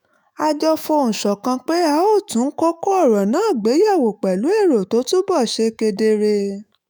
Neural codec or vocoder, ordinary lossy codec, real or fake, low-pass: autoencoder, 48 kHz, 128 numbers a frame, DAC-VAE, trained on Japanese speech; none; fake; none